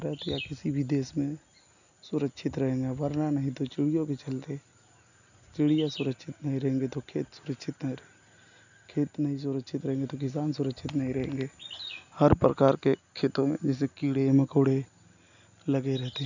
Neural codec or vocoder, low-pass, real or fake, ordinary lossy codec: none; 7.2 kHz; real; none